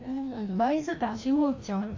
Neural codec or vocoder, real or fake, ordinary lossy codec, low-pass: codec, 16 kHz, 1 kbps, FreqCodec, larger model; fake; AAC, 48 kbps; 7.2 kHz